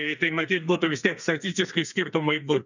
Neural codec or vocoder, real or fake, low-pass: codec, 32 kHz, 1.9 kbps, SNAC; fake; 7.2 kHz